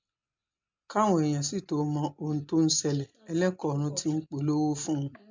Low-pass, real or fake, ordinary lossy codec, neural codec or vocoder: 7.2 kHz; real; MP3, 64 kbps; none